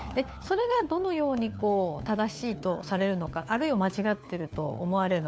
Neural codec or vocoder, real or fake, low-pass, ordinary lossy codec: codec, 16 kHz, 4 kbps, FunCodec, trained on LibriTTS, 50 frames a second; fake; none; none